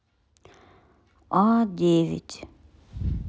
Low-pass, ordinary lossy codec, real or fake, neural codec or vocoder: none; none; real; none